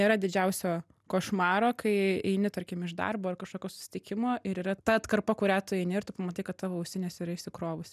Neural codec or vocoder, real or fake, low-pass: none; real; 14.4 kHz